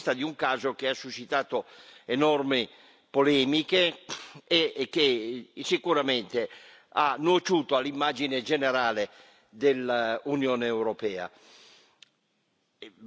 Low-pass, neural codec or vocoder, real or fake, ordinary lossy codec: none; none; real; none